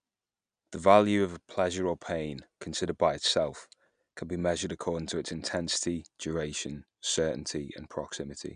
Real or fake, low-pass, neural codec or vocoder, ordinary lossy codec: real; 9.9 kHz; none; none